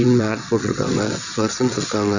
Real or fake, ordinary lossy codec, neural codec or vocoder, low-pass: fake; none; vocoder, 22.05 kHz, 80 mel bands, WaveNeXt; 7.2 kHz